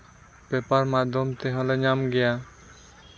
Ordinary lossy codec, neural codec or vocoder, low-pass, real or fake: none; none; none; real